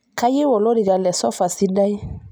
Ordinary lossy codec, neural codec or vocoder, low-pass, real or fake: none; none; none; real